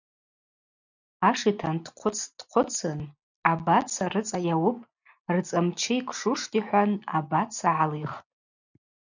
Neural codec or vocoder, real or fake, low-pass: none; real; 7.2 kHz